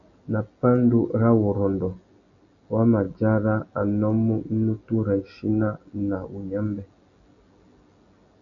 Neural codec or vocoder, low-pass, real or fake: none; 7.2 kHz; real